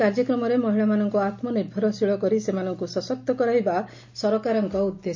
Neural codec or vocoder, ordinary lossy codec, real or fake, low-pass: none; MP3, 48 kbps; real; 7.2 kHz